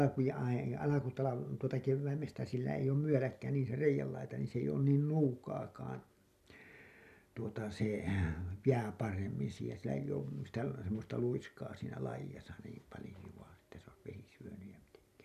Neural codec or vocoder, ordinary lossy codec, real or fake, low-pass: none; none; real; 14.4 kHz